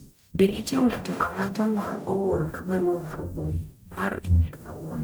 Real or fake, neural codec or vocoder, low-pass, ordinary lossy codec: fake; codec, 44.1 kHz, 0.9 kbps, DAC; none; none